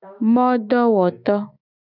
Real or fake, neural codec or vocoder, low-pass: fake; autoencoder, 48 kHz, 128 numbers a frame, DAC-VAE, trained on Japanese speech; 5.4 kHz